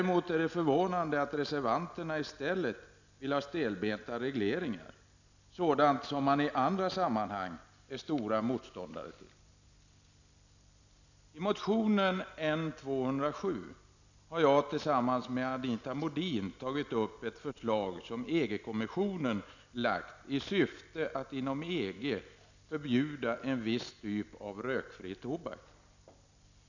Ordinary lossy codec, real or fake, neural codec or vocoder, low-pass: none; real; none; 7.2 kHz